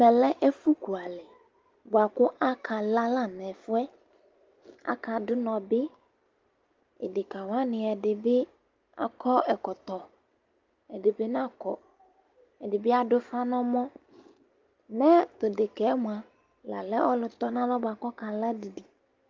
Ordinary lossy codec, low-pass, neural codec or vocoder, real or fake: Opus, 32 kbps; 7.2 kHz; none; real